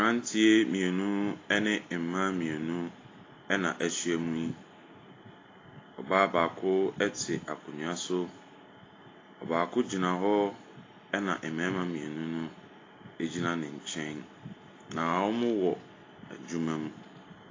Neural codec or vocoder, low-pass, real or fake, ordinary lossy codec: none; 7.2 kHz; real; AAC, 32 kbps